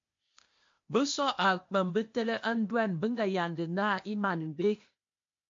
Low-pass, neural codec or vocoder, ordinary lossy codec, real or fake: 7.2 kHz; codec, 16 kHz, 0.8 kbps, ZipCodec; MP3, 48 kbps; fake